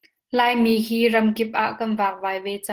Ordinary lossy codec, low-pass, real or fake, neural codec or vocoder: Opus, 16 kbps; 14.4 kHz; real; none